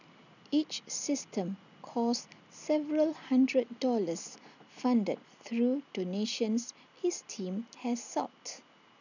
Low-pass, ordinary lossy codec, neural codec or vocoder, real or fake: 7.2 kHz; none; none; real